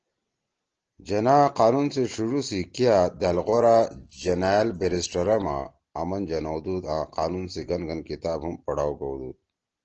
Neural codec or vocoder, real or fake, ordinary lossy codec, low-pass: none; real; Opus, 16 kbps; 7.2 kHz